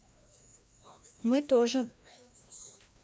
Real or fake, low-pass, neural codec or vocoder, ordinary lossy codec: fake; none; codec, 16 kHz, 1 kbps, FreqCodec, larger model; none